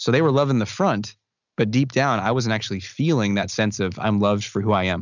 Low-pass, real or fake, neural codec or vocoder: 7.2 kHz; real; none